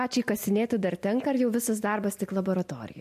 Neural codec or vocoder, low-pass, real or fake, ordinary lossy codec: none; 14.4 kHz; real; MP3, 64 kbps